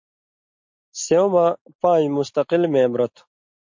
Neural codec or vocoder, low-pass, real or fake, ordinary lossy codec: none; 7.2 kHz; real; MP3, 48 kbps